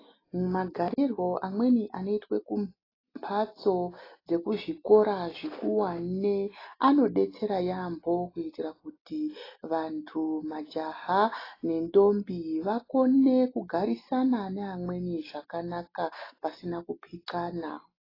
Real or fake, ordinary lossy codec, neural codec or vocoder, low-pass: real; AAC, 24 kbps; none; 5.4 kHz